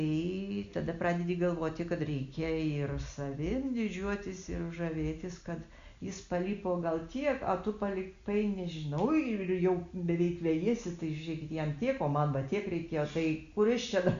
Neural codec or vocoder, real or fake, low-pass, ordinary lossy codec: none; real; 7.2 kHz; Opus, 64 kbps